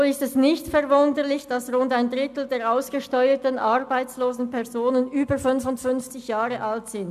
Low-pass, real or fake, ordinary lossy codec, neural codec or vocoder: 14.4 kHz; real; none; none